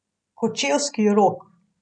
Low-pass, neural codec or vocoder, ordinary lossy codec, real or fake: 9.9 kHz; none; none; real